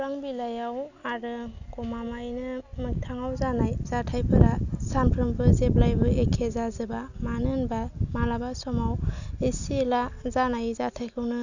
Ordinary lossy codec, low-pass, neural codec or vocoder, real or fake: none; 7.2 kHz; none; real